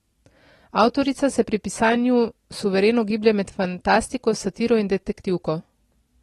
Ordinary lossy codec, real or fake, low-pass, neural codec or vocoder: AAC, 32 kbps; real; 19.8 kHz; none